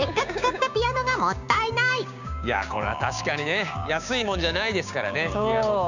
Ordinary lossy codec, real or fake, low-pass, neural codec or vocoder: none; fake; 7.2 kHz; codec, 16 kHz, 6 kbps, DAC